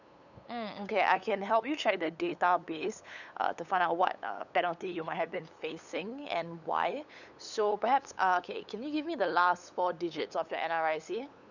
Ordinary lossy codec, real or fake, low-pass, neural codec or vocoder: none; fake; 7.2 kHz; codec, 16 kHz, 8 kbps, FunCodec, trained on LibriTTS, 25 frames a second